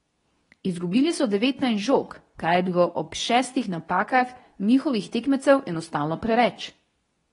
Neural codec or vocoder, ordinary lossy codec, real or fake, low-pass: codec, 24 kHz, 0.9 kbps, WavTokenizer, medium speech release version 2; AAC, 32 kbps; fake; 10.8 kHz